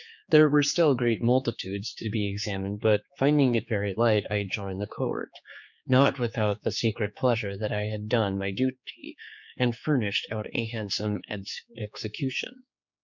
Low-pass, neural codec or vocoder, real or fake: 7.2 kHz; codec, 16 kHz, 4 kbps, X-Codec, HuBERT features, trained on general audio; fake